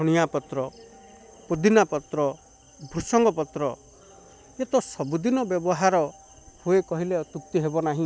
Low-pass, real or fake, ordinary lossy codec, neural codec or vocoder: none; real; none; none